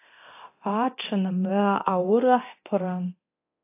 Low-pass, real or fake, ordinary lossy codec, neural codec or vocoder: 3.6 kHz; fake; AAC, 32 kbps; codec, 24 kHz, 0.9 kbps, DualCodec